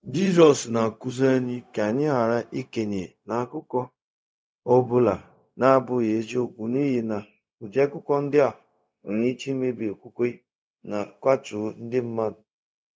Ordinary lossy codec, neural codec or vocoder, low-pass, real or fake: none; codec, 16 kHz, 0.4 kbps, LongCat-Audio-Codec; none; fake